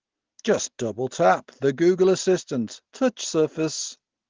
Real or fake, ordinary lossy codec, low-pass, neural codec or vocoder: real; Opus, 16 kbps; 7.2 kHz; none